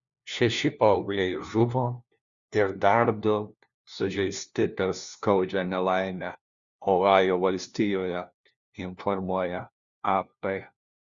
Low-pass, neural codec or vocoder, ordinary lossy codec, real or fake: 7.2 kHz; codec, 16 kHz, 1 kbps, FunCodec, trained on LibriTTS, 50 frames a second; Opus, 64 kbps; fake